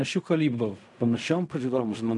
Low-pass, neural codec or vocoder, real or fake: 10.8 kHz; codec, 16 kHz in and 24 kHz out, 0.4 kbps, LongCat-Audio-Codec, fine tuned four codebook decoder; fake